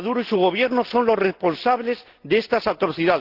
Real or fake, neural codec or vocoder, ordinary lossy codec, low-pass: real; none; Opus, 16 kbps; 5.4 kHz